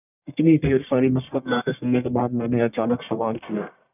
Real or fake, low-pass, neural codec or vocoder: fake; 3.6 kHz; codec, 44.1 kHz, 1.7 kbps, Pupu-Codec